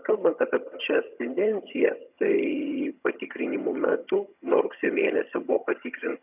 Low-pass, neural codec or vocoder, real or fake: 3.6 kHz; vocoder, 22.05 kHz, 80 mel bands, HiFi-GAN; fake